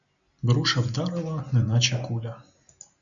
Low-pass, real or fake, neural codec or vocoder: 7.2 kHz; real; none